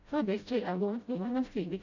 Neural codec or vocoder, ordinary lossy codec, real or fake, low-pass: codec, 16 kHz, 0.5 kbps, FreqCodec, smaller model; none; fake; 7.2 kHz